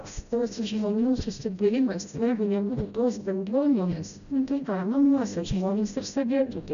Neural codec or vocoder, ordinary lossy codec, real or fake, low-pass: codec, 16 kHz, 0.5 kbps, FreqCodec, smaller model; MP3, 96 kbps; fake; 7.2 kHz